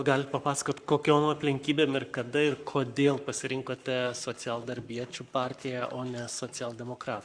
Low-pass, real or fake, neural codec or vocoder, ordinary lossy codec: 9.9 kHz; fake; codec, 44.1 kHz, 7.8 kbps, Pupu-Codec; Opus, 64 kbps